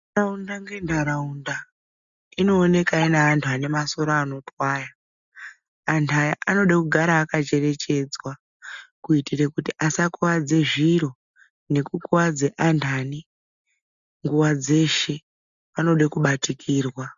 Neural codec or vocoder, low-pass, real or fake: none; 7.2 kHz; real